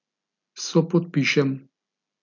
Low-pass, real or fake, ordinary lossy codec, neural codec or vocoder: 7.2 kHz; real; none; none